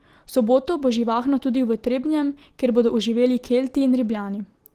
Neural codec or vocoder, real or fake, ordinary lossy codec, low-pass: none; real; Opus, 16 kbps; 14.4 kHz